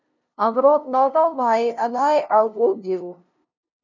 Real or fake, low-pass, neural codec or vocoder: fake; 7.2 kHz; codec, 16 kHz, 0.5 kbps, FunCodec, trained on LibriTTS, 25 frames a second